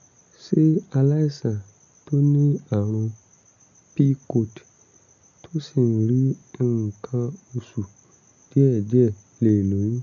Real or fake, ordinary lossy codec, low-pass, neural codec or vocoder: real; none; 7.2 kHz; none